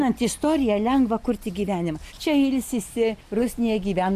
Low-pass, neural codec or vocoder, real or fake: 14.4 kHz; none; real